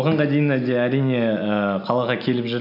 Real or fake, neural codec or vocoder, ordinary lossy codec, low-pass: real; none; none; 5.4 kHz